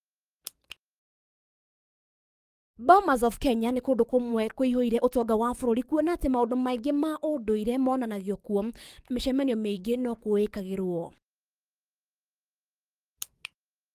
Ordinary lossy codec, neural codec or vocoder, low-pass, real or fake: Opus, 32 kbps; codec, 44.1 kHz, 7.8 kbps, DAC; 14.4 kHz; fake